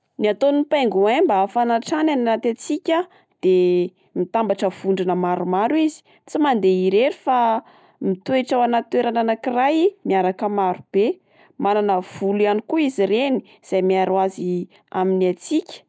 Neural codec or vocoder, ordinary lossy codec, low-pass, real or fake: none; none; none; real